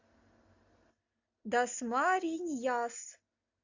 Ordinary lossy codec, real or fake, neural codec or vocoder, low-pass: AAC, 48 kbps; real; none; 7.2 kHz